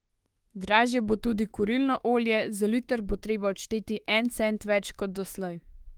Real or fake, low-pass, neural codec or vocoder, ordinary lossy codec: fake; 19.8 kHz; autoencoder, 48 kHz, 32 numbers a frame, DAC-VAE, trained on Japanese speech; Opus, 24 kbps